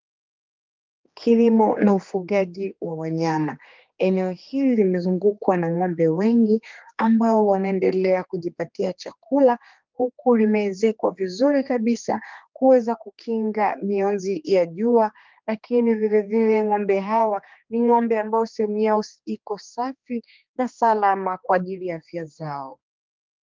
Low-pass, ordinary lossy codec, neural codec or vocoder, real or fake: 7.2 kHz; Opus, 32 kbps; codec, 16 kHz, 2 kbps, X-Codec, HuBERT features, trained on general audio; fake